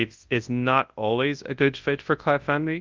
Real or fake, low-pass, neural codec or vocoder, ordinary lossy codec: fake; 7.2 kHz; codec, 24 kHz, 0.9 kbps, WavTokenizer, large speech release; Opus, 24 kbps